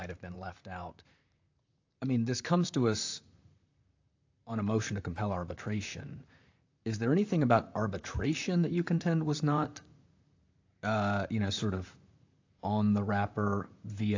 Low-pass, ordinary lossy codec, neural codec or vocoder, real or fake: 7.2 kHz; AAC, 48 kbps; vocoder, 44.1 kHz, 128 mel bands, Pupu-Vocoder; fake